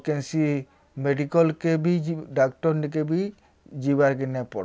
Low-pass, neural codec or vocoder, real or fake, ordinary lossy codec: none; none; real; none